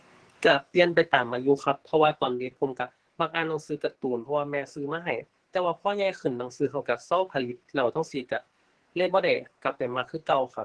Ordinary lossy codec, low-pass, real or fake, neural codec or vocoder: Opus, 16 kbps; 10.8 kHz; fake; codec, 44.1 kHz, 2.6 kbps, SNAC